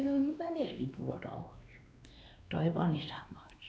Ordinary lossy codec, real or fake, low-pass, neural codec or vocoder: none; fake; none; codec, 16 kHz, 2 kbps, X-Codec, WavLM features, trained on Multilingual LibriSpeech